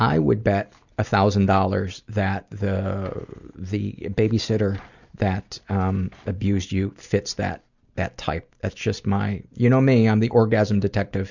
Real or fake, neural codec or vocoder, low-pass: real; none; 7.2 kHz